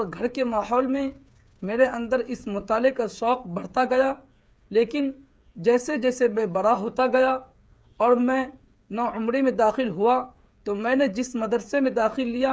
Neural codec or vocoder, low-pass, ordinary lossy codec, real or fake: codec, 16 kHz, 8 kbps, FreqCodec, smaller model; none; none; fake